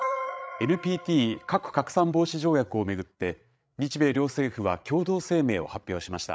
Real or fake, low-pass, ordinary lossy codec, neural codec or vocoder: fake; none; none; codec, 16 kHz, 8 kbps, FreqCodec, larger model